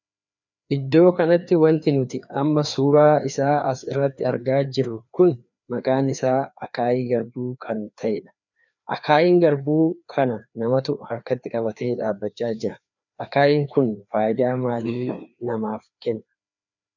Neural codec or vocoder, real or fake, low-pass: codec, 16 kHz, 2 kbps, FreqCodec, larger model; fake; 7.2 kHz